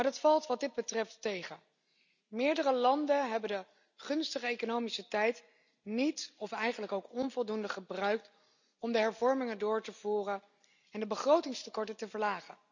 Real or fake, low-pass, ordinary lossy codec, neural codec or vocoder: real; 7.2 kHz; none; none